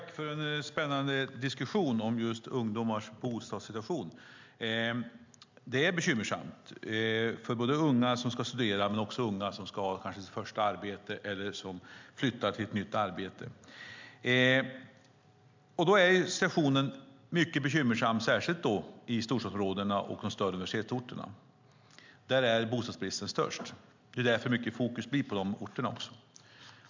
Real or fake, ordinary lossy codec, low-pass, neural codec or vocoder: real; MP3, 64 kbps; 7.2 kHz; none